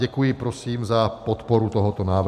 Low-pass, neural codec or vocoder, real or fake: 14.4 kHz; none; real